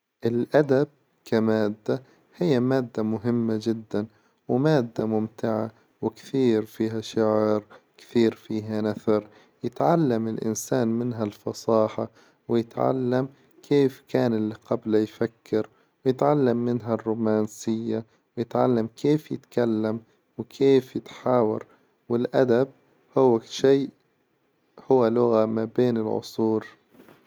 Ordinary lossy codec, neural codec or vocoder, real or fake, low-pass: none; none; real; none